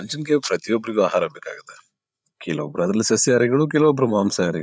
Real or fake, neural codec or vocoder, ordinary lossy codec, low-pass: fake; codec, 16 kHz, 8 kbps, FreqCodec, larger model; none; none